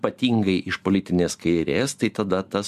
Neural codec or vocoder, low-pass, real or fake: none; 14.4 kHz; real